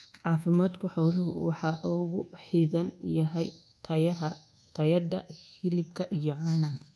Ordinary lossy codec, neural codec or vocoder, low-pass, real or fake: none; codec, 24 kHz, 1.2 kbps, DualCodec; none; fake